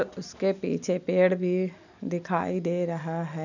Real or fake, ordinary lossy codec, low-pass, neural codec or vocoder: real; none; 7.2 kHz; none